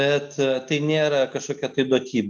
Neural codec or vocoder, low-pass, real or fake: none; 10.8 kHz; real